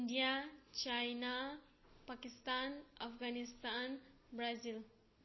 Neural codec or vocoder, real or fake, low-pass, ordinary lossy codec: none; real; 7.2 kHz; MP3, 24 kbps